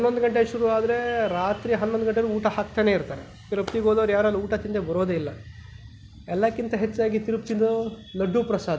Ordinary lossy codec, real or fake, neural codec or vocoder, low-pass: none; real; none; none